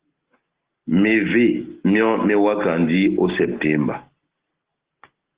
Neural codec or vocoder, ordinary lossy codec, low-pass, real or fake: none; Opus, 16 kbps; 3.6 kHz; real